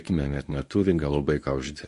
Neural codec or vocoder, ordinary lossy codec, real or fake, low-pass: codec, 24 kHz, 0.9 kbps, WavTokenizer, medium speech release version 1; MP3, 48 kbps; fake; 10.8 kHz